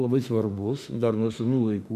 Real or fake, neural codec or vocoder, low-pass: fake; autoencoder, 48 kHz, 32 numbers a frame, DAC-VAE, trained on Japanese speech; 14.4 kHz